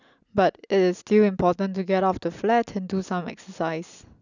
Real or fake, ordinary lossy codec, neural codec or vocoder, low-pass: real; none; none; 7.2 kHz